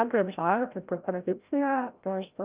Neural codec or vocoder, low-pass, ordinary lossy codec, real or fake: codec, 16 kHz, 0.5 kbps, FreqCodec, larger model; 3.6 kHz; Opus, 24 kbps; fake